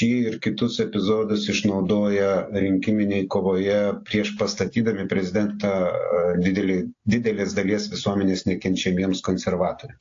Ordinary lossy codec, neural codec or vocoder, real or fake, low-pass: AAC, 48 kbps; none; real; 7.2 kHz